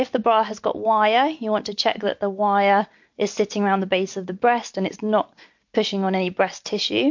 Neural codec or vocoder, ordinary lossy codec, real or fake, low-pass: none; MP3, 48 kbps; real; 7.2 kHz